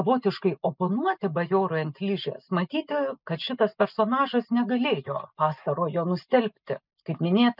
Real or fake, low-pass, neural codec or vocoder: real; 5.4 kHz; none